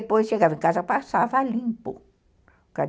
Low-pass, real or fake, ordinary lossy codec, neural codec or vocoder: none; real; none; none